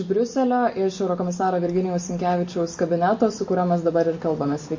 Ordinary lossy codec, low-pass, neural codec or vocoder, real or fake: MP3, 32 kbps; 7.2 kHz; none; real